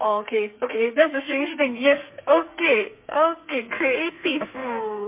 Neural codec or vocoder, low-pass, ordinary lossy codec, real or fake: codec, 32 kHz, 1.9 kbps, SNAC; 3.6 kHz; MP3, 32 kbps; fake